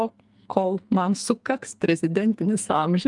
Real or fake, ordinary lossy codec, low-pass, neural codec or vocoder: fake; Opus, 32 kbps; 10.8 kHz; codec, 44.1 kHz, 2.6 kbps, SNAC